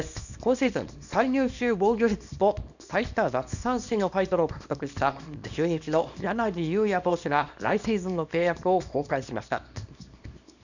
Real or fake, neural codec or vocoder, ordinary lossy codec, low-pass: fake; codec, 24 kHz, 0.9 kbps, WavTokenizer, small release; none; 7.2 kHz